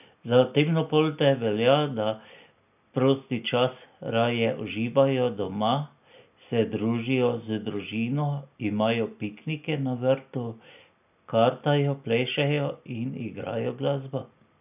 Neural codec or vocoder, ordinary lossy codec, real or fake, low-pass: none; none; real; 3.6 kHz